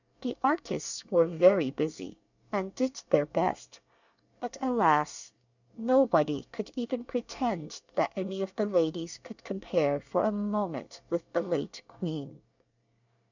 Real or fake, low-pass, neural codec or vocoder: fake; 7.2 kHz; codec, 24 kHz, 1 kbps, SNAC